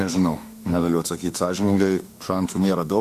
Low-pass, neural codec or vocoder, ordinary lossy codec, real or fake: 14.4 kHz; autoencoder, 48 kHz, 32 numbers a frame, DAC-VAE, trained on Japanese speech; Opus, 64 kbps; fake